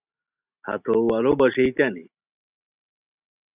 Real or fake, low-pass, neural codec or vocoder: real; 3.6 kHz; none